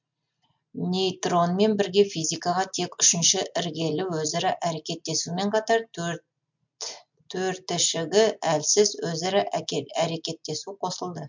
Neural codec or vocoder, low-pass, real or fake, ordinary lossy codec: none; 7.2 kHz; real; MP3, 64 kbps